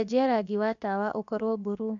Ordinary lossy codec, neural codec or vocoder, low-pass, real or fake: none; codec, 16 kHz, 0.7 kbps, FocalCodec; 7.2 kHz; fake